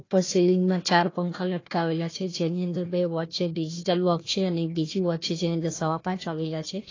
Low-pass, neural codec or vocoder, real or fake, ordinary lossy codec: 7.2 kHz; codec, 16 kHz, 1 kbps, FunCodec, trained on Chinese and English, 50 frames a second; fake; AAC, 32 kbps